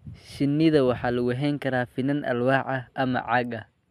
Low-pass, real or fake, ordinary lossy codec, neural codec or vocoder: 14.4 kHz; real; MP3, 96 kbps; none